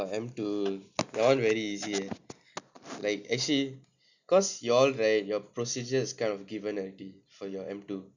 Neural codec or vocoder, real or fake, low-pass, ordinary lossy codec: none; real; 7.2 kHz; none